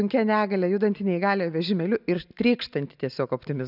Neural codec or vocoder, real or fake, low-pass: none; real; 5.4 kHz